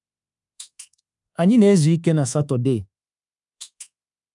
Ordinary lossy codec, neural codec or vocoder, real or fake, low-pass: none; codec, 24 kHz, 1.2 kbps, DualCodec; fake; 10.8 kHz